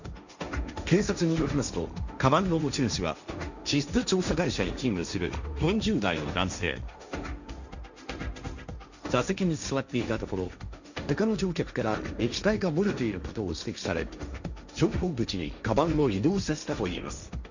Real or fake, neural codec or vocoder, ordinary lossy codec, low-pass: fake; codec, 16 kHz, 1.1 kbps, Voila-Tokenizer; none; 7.2 kHz